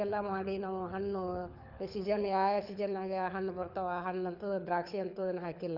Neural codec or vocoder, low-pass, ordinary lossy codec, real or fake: codec, 24 kHz, 6 kbps, HILCodec; 5.4 kHz; none; fake